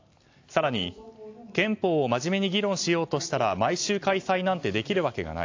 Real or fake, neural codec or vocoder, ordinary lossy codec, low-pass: real; none; AAC, 48 kbps; 7.2 kHz